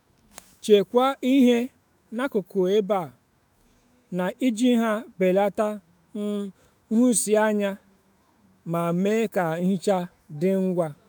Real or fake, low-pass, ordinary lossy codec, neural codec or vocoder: fake; none; none; autoencoder, 48 kHz, 128 numbers a frame, DAC-VAE, trained on Japanese speech